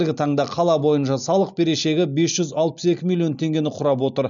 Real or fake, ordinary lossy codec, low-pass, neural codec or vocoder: real; none; 7.2 kHz; none